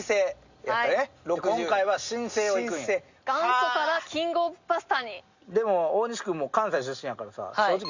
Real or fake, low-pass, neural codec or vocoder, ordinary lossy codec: real; 7.2 kHz; none; Opus, 64 kbps